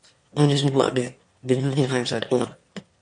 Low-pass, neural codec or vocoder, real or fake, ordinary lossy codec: 9.9 kHz; autoencoder, 22.05 kHz, a latent of 192 numbers a frame, VITS, trained on one speaker; fake; MP3, 48 kbps